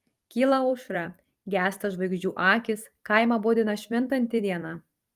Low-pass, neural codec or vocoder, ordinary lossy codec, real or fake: 14.4 kHz; vocoder, 44.1 kHz, 128 mel bands every 256 samples, BigVGAN v2; Opus, 32 kbps; fake